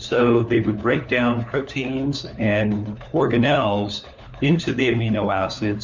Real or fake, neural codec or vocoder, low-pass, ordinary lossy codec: fake; codec, 16 kHz, 4 kbps, FunCodec, trained on LibriTTS, 50 frames a second; 7.2 kHz; MP3, 64 kbps